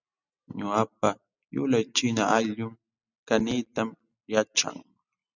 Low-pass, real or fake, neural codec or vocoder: 7.2 kHz; real; none